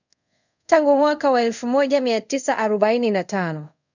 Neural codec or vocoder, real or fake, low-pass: codec, 24 kHz, 0.5 kbps, DualCodec; fake; 7.2 kHz